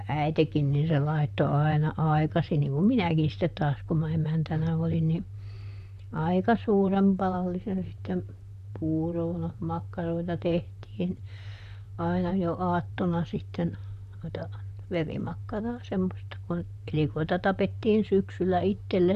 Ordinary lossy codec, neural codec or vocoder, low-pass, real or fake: none; vocoder, 44.1 kHz, 128 mel bands, Pupu-Vocoder; 14.4 kHz; fake